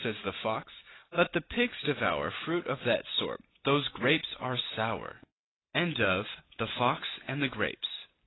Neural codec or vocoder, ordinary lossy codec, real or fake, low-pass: none; AAC, 16 kbps; real; 7.2 kHz